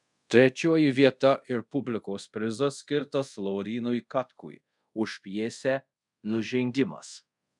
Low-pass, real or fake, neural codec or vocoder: 10.8 kHz; fake; codec, 24 kHz, 0.5 kbps, DualCodec